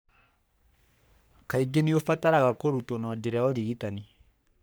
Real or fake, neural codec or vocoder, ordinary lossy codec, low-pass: fake; codec, 44.1 kHz, 3.4 kbps, Pupu-Codec; none; none